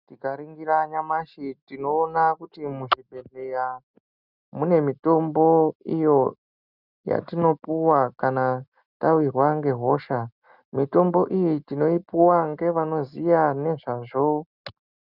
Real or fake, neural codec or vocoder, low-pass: real; none; 5.4 kHz